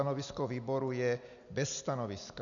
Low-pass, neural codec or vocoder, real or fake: 7.2 kHz; none; real